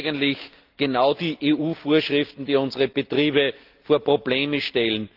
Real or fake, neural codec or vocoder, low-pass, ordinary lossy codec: real; none; 5.4 kHz; Opus, 32 kbps